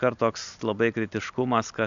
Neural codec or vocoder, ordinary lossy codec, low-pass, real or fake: none; MP3, 96 kbps; 7.2 kHz; real